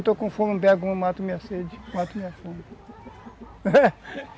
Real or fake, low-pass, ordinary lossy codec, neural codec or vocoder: real; none; none; none